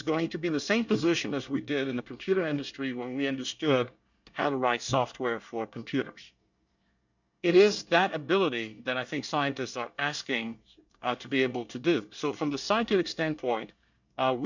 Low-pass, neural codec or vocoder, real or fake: 7.2 kHz; codec, 24 kHz, 1 kbps, SNAC; fake